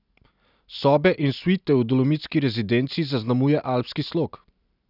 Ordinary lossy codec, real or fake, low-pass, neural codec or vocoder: none; real; 5.4 kHz; none